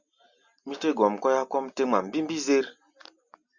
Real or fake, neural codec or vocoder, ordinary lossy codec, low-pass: real; none; Opus, 64 kbps; 7.2 kHz